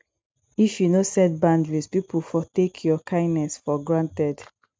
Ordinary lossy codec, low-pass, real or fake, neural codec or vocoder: none; none; real; none